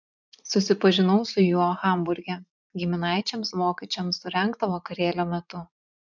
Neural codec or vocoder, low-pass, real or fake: vocoder, 44.1 kHz, 80 mel bands, Vocos; 7.2 kHz; fake